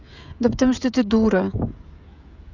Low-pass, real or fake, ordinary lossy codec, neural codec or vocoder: 7.2 kHz; fake; none; codec, 16 kHz, 16 kbps, FreqCodec, smaller model